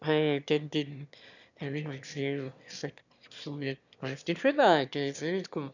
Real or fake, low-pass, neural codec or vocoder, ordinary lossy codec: fake; 7.2 kHz; autoencoder, 22.05 kHz, a latent of 192 numbers a frame, VITS, trained on one speaker; none